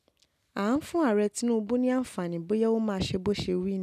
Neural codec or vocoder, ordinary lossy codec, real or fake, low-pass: none; none; real; 14.4 kHz